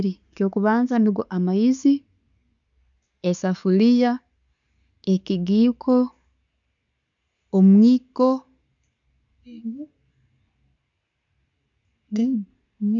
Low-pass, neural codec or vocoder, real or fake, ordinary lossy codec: 7.2 kHz; none; real; none